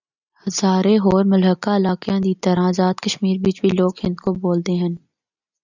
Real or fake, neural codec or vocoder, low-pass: real; none; 7.2 kHz